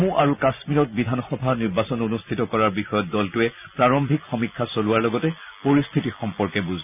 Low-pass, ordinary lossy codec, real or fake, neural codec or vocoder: 3.6 kHz; none; real; none